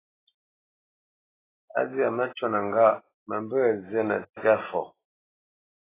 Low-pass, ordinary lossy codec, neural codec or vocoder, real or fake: 3.6 kHz; AAC, 16 kbps; none; real